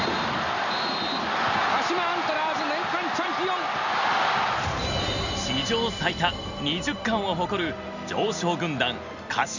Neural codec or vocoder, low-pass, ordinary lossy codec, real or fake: none; 7.2 kHz; none; real